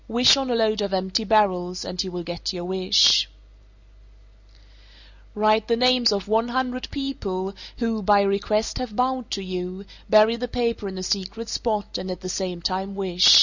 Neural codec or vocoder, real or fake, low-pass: none; real; 7.2 kHz